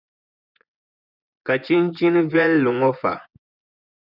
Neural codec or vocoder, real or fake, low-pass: vocoder, 24 kHz, 100 mel bands, Vocos; fake; 5.4 kHz